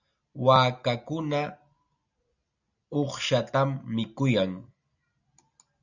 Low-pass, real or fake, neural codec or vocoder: 7.2 kHz; real; none